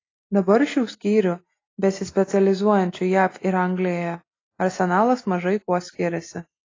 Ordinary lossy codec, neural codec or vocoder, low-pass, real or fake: AAC, 32 kbps; none; 7.2 kHz; real